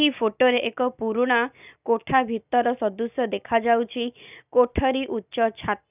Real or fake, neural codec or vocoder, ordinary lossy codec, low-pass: real; none; none; 3.6 kHz